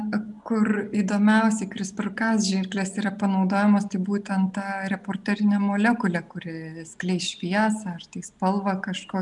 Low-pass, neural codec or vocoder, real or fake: 10.8 kHz; none; real